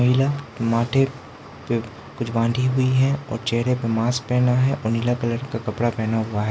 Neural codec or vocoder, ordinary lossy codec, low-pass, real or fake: none; none; none; real